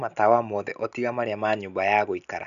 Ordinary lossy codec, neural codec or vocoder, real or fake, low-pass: none; none; real; 7.2 kHz